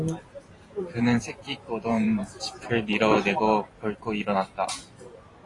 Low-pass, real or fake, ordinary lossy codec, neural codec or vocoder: 10.8 kHz; fake; AAC, 32 kbps; vocoder, 44.1 kHz, 128 mel bands every 512 samples, BigVGAN v2